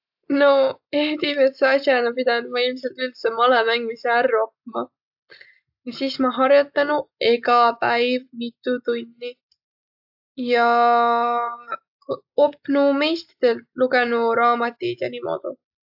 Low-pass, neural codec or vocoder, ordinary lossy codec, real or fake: 5.4 kHz; none; AAC, 48 kbps; real